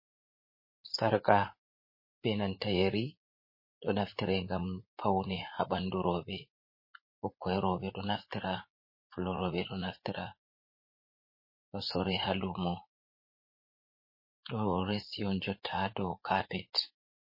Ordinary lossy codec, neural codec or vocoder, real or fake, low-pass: MP3, 24 kbps; vocoder, 44.1 kHz, 80 mel bands, Vocos; fake; 5.4 kHz